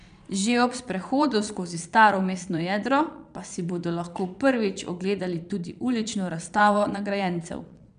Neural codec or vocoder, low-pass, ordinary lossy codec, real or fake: vocoder, 22.05 kHz, 80 mel bands, Vocos; 9.9 kHz; none; fake